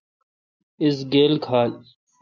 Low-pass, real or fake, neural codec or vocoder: 7.2 kHz; real; none